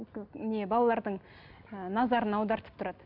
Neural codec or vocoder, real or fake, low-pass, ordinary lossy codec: none; real; 5.4 kHz; none